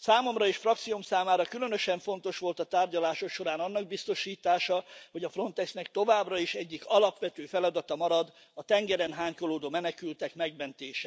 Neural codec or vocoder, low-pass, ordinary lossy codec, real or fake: none; none; none; real